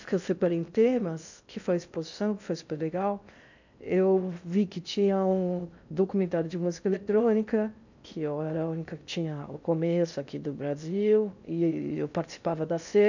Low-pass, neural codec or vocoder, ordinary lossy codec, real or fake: 7.2 kHz; codec, 16 kHz in and 24 kHz out, 0.6 kbps, FocalCodec, streaming, 2048 codes; none; fake